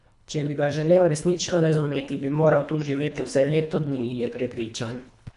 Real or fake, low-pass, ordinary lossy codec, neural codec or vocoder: fake; 10.8 kHz; none; codec, 24 kHz, 1.5 kbps, HILCodec